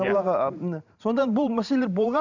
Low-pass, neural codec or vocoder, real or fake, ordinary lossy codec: 7.2 kHz; vocoder, 44.1 kHz, 128 mel bands every 512 samples, BigVGAN v2; fake; none